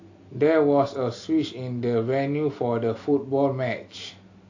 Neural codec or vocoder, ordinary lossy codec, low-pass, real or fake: none; AAC, 48 kbps; 7.2 kHz; real